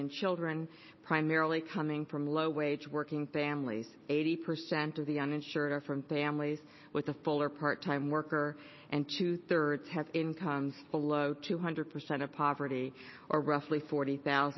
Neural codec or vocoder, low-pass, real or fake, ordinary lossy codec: none; 7.2 kHz; real; MP3, 24 kbps